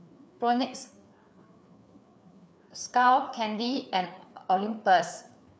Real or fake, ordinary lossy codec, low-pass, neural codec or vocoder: fake; none; none; codec, 16 kHz, 4 kbps, FreqCodec, larger model